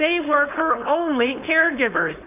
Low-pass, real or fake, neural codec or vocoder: 3.6 kHz; fake; codec, 16 kHz, 4.8 kbps, FACodec